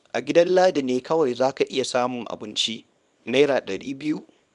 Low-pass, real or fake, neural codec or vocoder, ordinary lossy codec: 10.8 kHz; fake; codec, 24 kHz, 0.9 kbps, WavTokenizer, small release; none